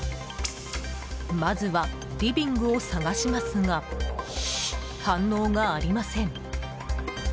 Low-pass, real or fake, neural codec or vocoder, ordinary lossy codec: none; real; none; none